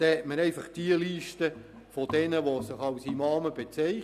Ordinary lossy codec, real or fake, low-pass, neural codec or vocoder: none; real; 14.4 kHz; none